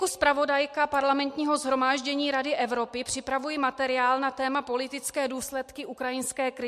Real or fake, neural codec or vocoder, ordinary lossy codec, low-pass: real; none; MP3, 64 kbps; 14.4 kHz